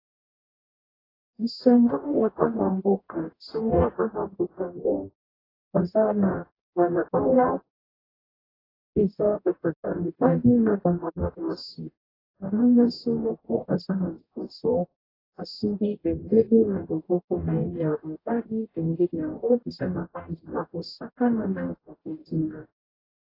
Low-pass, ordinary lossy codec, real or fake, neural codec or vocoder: 5.4 kHz; AAC, 24 kbps; fake; codec, 44.1 kHz, 0.9 kbps, DAC